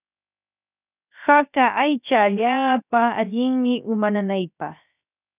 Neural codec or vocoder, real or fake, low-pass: codec, 16 kHz, 0.7 kbps, FocalCodec; fake; 3.6 kHz